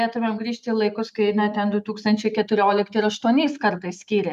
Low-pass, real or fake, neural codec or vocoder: 14.4 kHz; real; none